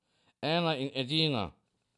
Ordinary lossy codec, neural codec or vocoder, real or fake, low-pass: none; none; real; 10.8 kHz